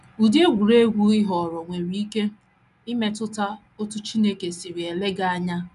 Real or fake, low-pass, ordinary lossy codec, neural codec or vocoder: real; 10.8 kHz; none; none